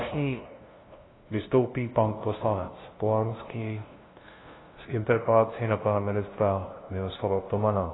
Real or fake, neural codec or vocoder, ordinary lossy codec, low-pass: fake; codec, 16 kHz, 0.5 kbps, FunCodec, trained on LibriTTS, 25 frames a second; AAC, 16 kbps; 7.2 kHz